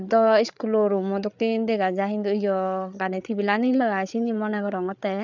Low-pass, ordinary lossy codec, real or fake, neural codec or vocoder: 7.2 kHz; none; fake; codec, 16 kHz, 8 kbps, FreqCodec, larger model